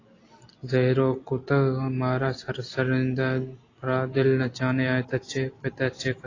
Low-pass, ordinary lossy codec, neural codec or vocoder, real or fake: 7.2 kHz; AAC, 32 kbps; none; real